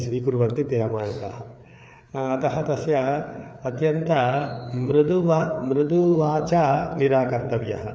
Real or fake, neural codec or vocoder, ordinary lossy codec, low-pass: fake; codec, 16 kHz, 4 kbps, FreqCodec, larger model; none; none